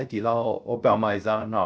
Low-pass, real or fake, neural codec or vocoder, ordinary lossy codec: none; fake; codec, 16 kHz, 0.3 kbps, FocalCodec; none